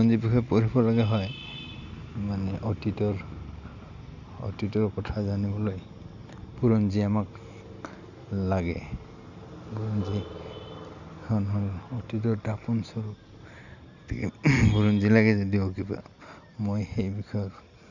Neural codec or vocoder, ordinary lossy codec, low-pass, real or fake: none; none; 7.2 kHz; real